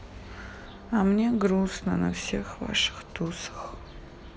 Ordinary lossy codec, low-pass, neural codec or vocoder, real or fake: none; none; none; real